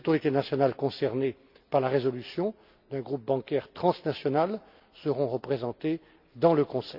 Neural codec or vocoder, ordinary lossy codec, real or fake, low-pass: none; none; real; 5.4 kHz